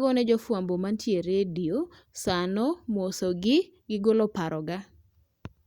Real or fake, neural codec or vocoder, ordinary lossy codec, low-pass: real; none; Opus, 64 kbps; 19.8 kHz